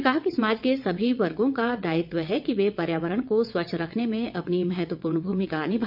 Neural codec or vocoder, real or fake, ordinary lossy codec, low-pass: vocoder, 22.05 kHz, 80 mel bands, WaveNeXt; fake; AAC, 48 kbps; 5.4 kHz